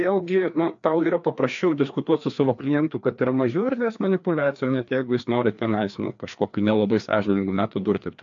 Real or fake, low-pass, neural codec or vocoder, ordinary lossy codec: fake; 7.2 kHz; codec, 16 kHz, 2 kbps, FreqCodec, larger model; AAC, 64 kbps